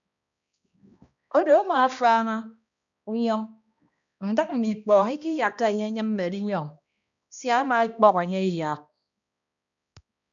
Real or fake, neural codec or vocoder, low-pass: fake; codec, 16 kHz, 1 kbps, X-Codec, HuBERT features, trained on balanced general audio; 7.2 kHz